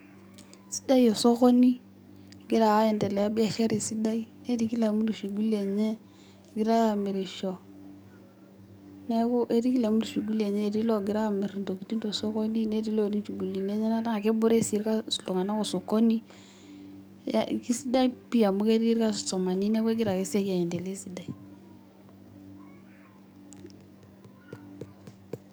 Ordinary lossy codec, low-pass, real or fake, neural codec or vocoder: none; none; fake; codec, 44.1 kHz, 7.8 kbps, DAC